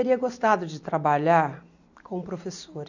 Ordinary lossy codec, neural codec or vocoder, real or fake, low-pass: none; none; real; 7.2 kHz